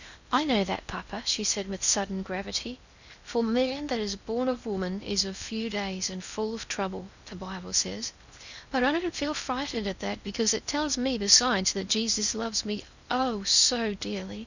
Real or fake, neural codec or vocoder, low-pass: fake; codec, 16 kHz in and 24 kHz out, 0.6 kbps, FocalCodec, streaming, 4096 codes; 7.2 kHz